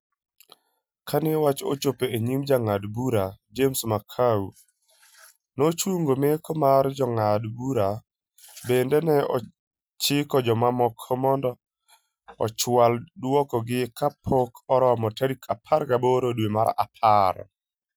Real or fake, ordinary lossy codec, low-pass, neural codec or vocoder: real; none; none; none